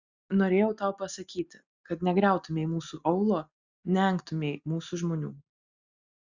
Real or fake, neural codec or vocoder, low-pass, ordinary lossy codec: real; none; 7.2 kHz; Opus, 64 kbps